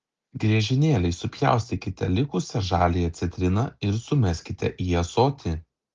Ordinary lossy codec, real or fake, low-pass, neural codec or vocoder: Opus, 32 kbps; real; 7.2 kHz; none